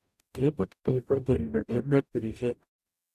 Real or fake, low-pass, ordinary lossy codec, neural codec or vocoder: fake; 14.4 kHz; none; codec, 44.1 kHz, 0.9 kbps, DAC